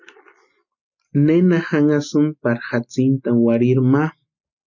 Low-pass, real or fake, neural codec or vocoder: 7.2 kHz; real; none